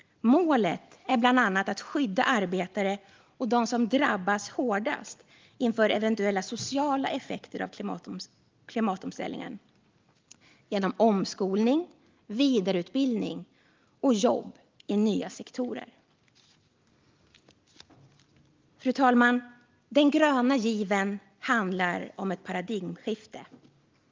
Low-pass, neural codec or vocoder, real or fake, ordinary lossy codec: 7.2 kHz; none; real; Opus, 32 kbps